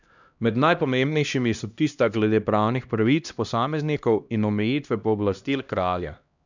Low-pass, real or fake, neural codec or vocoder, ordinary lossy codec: 7.2 kHz; fake; codec, 16 kHz, 1 kbps, X-Codec, HuBERT features, trained on LibriSpeech; none